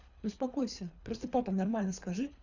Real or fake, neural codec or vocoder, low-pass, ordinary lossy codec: fake; codec, 24 kHz, 3 kbps, HILCodec; 7.2 kHz; none